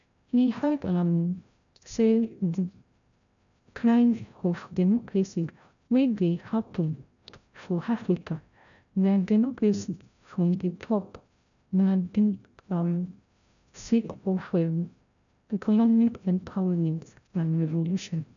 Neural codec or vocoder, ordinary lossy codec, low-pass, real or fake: codec, 16 kHz, 0.5 kbps, FreqCodec, larger model; none; 7.2 kHz; fake